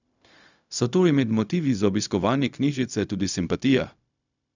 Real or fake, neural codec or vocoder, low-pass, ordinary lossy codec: fake; codec, 16 kHz, 0.4 kbps, LongCat-Audio-Codec; 7.2 kHz; none